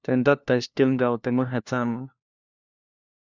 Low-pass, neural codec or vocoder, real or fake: 7.2 kHz; codec, 16 kHz, 1 kbps, FunCodec, trained on LibriTTS, 50 frames a second; fake